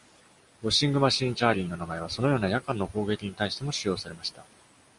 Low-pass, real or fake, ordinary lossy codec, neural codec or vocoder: 10.8 kHz; real; Opus, 64 kbps; none